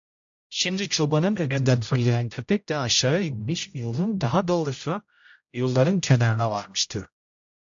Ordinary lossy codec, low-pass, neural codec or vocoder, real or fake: AAC, 64 kbps; 7.2 kHz; codec, 16 kHz, 0.5 kbps, X-Codec, HuBERT features, trained on general audio; fake